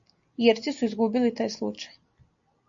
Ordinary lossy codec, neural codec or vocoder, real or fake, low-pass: AAC, 64 kbps; none; real; 7.2 kHz